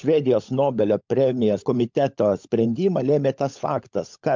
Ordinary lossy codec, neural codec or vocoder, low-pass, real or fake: MP3, 64 kbps; codec, 16 kHz, 16 kbps, FunCodec, trained on LibriTTS, 50 frames a second; 7.2 kHz; fake